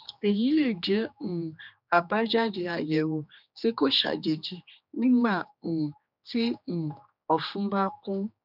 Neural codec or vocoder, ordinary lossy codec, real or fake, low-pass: codec, 16 kHz, 2 kbps, X-Codec, HuBERT features, trained on general audio; none; fake; 5.4 kHz